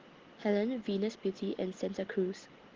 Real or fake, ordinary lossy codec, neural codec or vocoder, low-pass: real; Opus, 16 kbps; none; 7.2 kHz